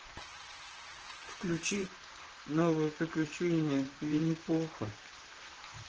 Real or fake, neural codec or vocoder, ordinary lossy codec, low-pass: fake; vocoder, 44.1 kHz, 128 mel bands, Pupu-Vocoder; Opus, 16 kbps; 7.2 kHz